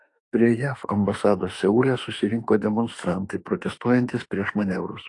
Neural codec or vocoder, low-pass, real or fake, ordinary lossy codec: autoencoder, 48 kHz, 32 numbers a frame, DAC-VAE, trained on Japanese speech; 10.8 kHz; fake; AAC, 48 kbps